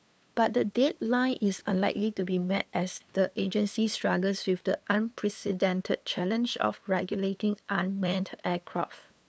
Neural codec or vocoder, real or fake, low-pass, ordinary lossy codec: codec, 16 kHz, 2 kbps, FunCodec, trained on LibriTTS, 25 frames a second; fake; none; none